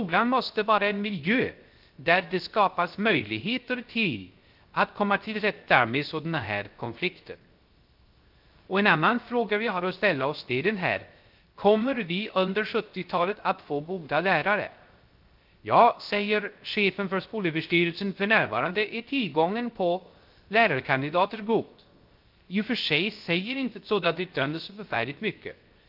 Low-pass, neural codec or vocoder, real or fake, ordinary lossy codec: 5.4 kHz; codec, 16 kHz, 0.3 kbps, FocalCodec; fake; Opus, 32 kbps